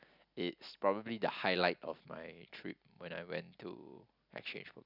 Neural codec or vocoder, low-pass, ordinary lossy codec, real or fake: none; 5.4 kHz; none; real